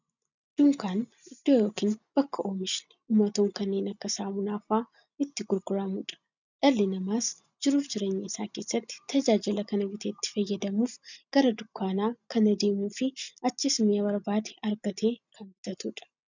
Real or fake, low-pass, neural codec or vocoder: real; 7.2 kHz; none